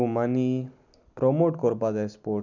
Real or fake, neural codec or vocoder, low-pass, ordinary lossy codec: real; none; 7.2 kHz; none